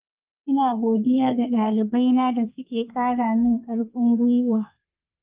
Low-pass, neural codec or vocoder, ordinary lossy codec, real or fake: 3.6 kHz; codec, 32 kHz, 1.9 kbps, SNAC; Opus, 24 kbps; fake